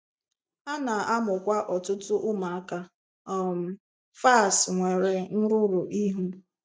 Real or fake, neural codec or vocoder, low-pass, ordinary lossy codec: real; none; none; none